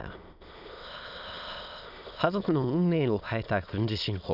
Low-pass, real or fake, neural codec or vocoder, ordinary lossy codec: 5.4 kHz; fake; autoencoder, 22.05 kHz, a latent of 192 numbers a frame, VITS, trained on many speakers; none